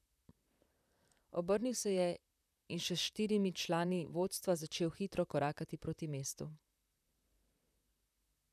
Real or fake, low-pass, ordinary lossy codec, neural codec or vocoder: fake; 14.4 kHz; none; vocoder, 44.1 kHz, 128 mel bands, Pupu-Vocoder